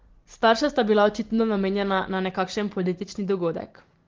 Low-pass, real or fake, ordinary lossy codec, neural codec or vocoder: 7.2 kHz; real; Opus, 16 kbps; none